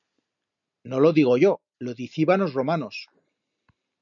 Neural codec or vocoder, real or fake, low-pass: none; real; 7.2 kHz